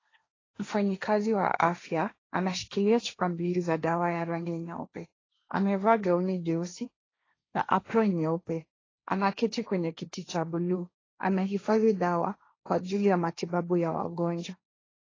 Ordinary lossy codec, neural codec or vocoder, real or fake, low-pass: AAC, 32 kbps; codec, 16 kHz, 1.1 kbps, Voila-Tokenizer; fake; 7.2 kHz